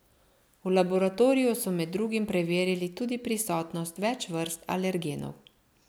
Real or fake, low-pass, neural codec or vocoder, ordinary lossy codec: real; none; none; none